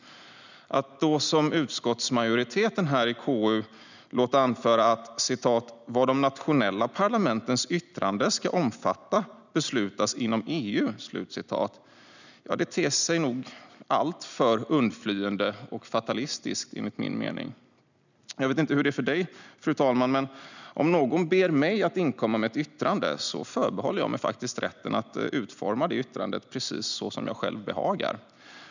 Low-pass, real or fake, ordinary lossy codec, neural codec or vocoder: 7.2 kHz; real; none; none